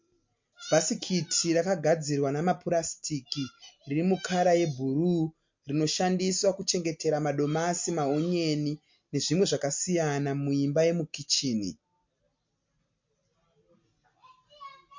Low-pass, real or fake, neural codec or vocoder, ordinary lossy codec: 7.2 kHz; real; none; MP3, 48 kbps